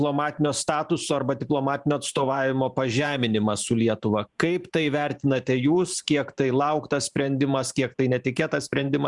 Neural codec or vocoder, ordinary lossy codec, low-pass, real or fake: vocoder, 44.1 kHz, 128 mel bands every 512 samples, BigVGAN v2; Opus, 64 kbps; 10.8 kHz; fake